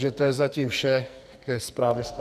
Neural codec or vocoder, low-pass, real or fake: codec, 44.1 kHz, 2.6 kbps, SNAC; 14.4 kHz; fake